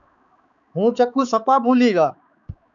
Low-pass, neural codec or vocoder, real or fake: 7.2 kHz; codec, 16 kHz, 4 kbps, X-Codec, HuBERT features, trained on balanced general audio; fake